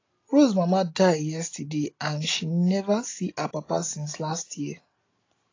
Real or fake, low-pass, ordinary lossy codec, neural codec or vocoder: real; 7.2 kHz; AAC, 32 kbps; none